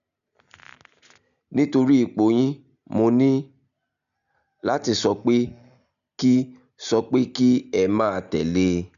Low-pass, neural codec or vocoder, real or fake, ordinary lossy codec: 7.2 kHz; none; real; none